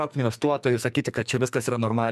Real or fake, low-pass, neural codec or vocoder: fake; 14.4 kHz; codec, 44.1 kHz, 2.6 kbps, SNAC